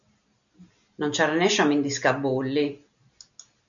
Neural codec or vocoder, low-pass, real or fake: none; 7.2 kHz; real